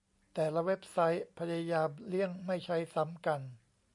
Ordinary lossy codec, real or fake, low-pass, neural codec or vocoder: MP3, 48 kbps; real; 10.8 kHz; none